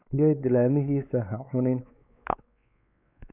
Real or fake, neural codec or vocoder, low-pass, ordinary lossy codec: fake; codec, 16 kHz, 4 kbps, X-Codec, WavLM features, trained on Multilingual LibriSpeech; 3.6 kHz; none